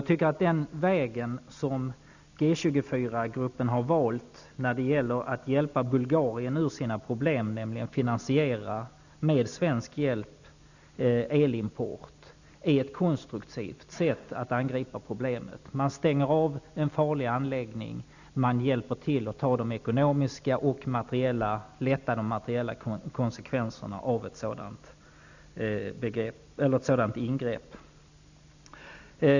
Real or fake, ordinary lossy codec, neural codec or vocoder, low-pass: real; none; none; 7.2 kHz